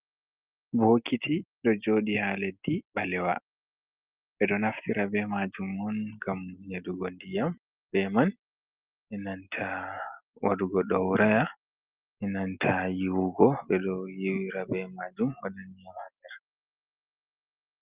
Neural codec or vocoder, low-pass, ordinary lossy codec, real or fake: none; 3.6 kHz; Opus, 32 kbps; real